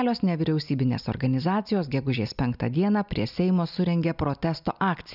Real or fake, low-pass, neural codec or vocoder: real; 5.4 kHz; none